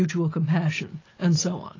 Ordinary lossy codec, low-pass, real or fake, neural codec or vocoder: AAC, 32 kbps; 7.2 kHz; real; none